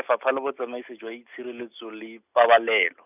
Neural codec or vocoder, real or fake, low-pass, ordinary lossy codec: none; real; 3.6 kHz; none